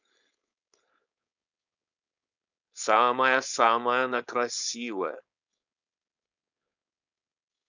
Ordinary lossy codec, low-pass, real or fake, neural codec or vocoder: none; 7.2 kHz; fake; codec, 16 kHz, 4.8 kbps, FACodec